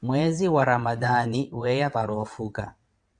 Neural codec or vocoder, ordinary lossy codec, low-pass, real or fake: vocoder, 22.05 kHz, 80 mel bands, WaveNeXt; MP3, 96 kbps; 9.9 kHz; fake